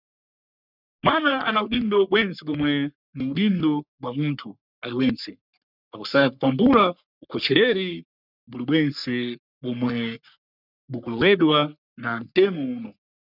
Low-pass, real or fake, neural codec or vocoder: 5.4 kHz; fake; codec, 44.1 kHz, 3.4 kbps, Pupu-Codec